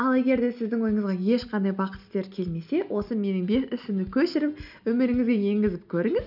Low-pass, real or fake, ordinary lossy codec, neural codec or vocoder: 5.4 kHz; real; none; none